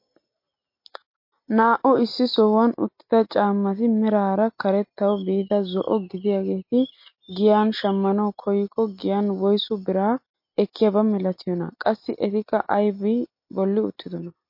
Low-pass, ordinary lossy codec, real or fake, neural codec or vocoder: 5.4 kHz; MP3, 32 kbps; real; none